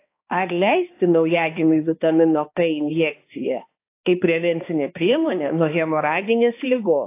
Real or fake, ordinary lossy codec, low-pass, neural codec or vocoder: fake; AAC, 24 kbps; 3.6 kHz; codec, 16 kHz, 2 kbps, X-Codec, HuBERT features, trained on balanced general audio